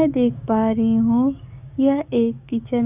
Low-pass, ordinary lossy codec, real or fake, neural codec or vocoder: 3.6 kHz; none; fake; codec, 16 kHz, 6 kbps, DAC